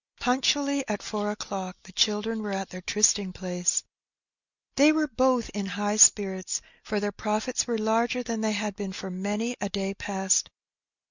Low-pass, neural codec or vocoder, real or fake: 7.2 kHz; none; real